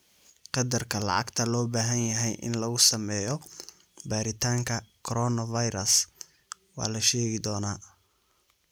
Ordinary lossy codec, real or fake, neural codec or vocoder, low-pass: none; real; none; none